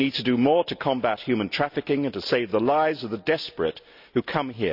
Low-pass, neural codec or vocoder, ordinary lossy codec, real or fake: 5.4 kHz; none; none; real